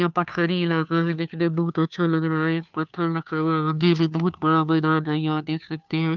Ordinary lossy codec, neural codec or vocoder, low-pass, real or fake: none; codec, 16 kHz, 2 kbps, FunCodec, trained on Chinese and English, 25 frames a second; 7.2 kHz; fake